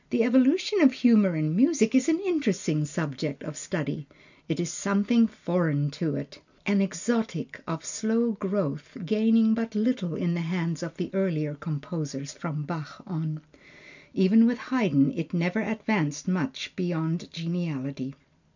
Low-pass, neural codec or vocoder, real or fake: 7.2 kHz; none; real